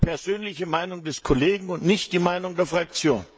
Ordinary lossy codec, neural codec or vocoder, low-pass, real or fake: none; codec, 16 kHz, 16 kbps, FreqCodec, smaller model; none; fake